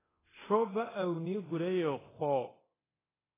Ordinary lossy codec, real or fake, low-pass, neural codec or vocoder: AAC, 16 kbps; fake; 3.6 kHz; codec, 24 kHz, 0.9 kbps, DualCodec